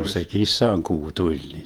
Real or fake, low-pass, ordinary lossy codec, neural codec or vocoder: fake; 19.8 kHz; Opus, 32 kbps; vocoder, 48 kHz, 128 mel bands, Vocos